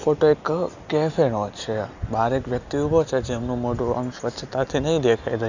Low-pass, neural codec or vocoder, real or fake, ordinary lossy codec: 7.2 kHz; none; real; none